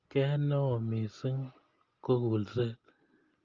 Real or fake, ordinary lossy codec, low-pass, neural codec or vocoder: real; Opus, 24 kbps; 7.2 kHz; none